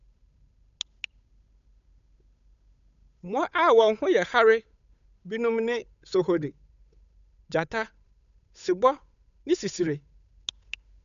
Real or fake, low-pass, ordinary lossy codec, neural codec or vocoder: fake; 7.2 kHz; none; codec, 16 kHz, 8 kbps, FunCodec, trained on Chinese and English, 25 frames a second